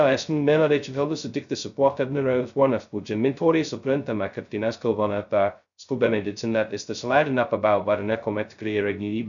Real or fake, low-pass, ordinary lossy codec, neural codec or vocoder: fake; 7.2 kHz; MP3, 96 kbps; codec, 16 kHz, 0.2 kbps, FocalCodec